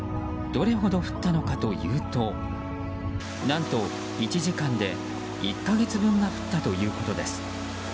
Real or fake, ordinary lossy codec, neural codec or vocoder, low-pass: real; none; none; none